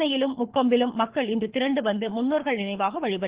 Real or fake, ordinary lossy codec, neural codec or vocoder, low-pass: fake; Opus, 16 kbps; codec, 24 kHz, 6 kbps, HILCodec; 3.6 kHz